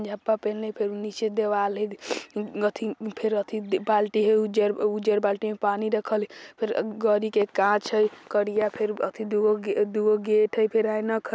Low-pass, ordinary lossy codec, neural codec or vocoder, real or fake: none; none; none; real